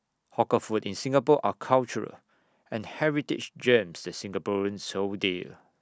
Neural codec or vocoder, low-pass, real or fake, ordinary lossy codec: none; none; real; none